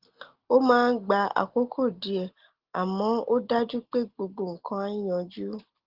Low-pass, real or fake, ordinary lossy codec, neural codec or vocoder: 5.4 kHz; real; Opus, 16 kbps; none